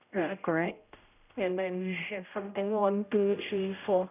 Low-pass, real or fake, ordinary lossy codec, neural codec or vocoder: 3.6 kHz; fake; none; codec, 16 kHz, 0.5 kbps, X-Codec, HuBERT features, trained on general audio